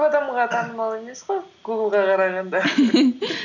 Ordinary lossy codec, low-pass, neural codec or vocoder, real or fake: none; 7.2 kHz; none; real